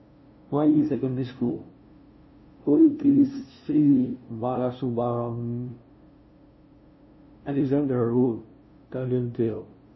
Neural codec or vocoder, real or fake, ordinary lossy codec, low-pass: codec, 16 kHz, 0.5 kbps, FunCodec, trained on LibriTTS, 25 frames a second; fake; MP3, 24 kbps; 7.2 kHz